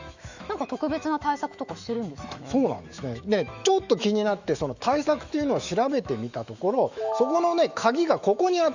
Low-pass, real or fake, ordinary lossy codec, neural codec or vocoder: 7.2 kHz; fake; Opus, 64 kbps; autoencoder, 48 kHz, 128 numbers a frame, DAC-VAE, trained on Japanese speech